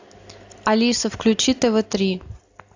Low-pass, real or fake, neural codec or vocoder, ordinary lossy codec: 7.2 kHz; real; none; AAC, 48 kbps